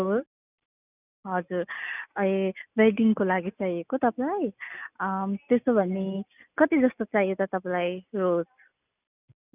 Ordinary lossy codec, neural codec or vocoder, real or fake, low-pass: none; none; real; 3.6 kHz